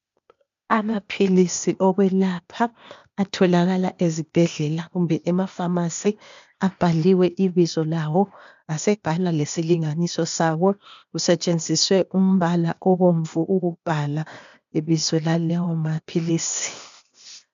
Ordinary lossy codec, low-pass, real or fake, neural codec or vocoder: MP3, 64 kbps; 7.2 kHz; fake; codec, 16 kHz, 0.8 kbps, ZipCodec